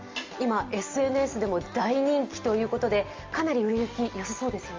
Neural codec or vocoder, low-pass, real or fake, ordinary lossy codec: none; 7.2 kHz; real; Opus, 32 kbps